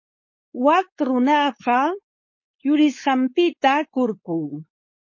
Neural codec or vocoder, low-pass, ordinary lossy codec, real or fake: codec, 16 kHz, 4.8 kbps, FACodec; 7.2 kHz; MP3, 32 kbps; fake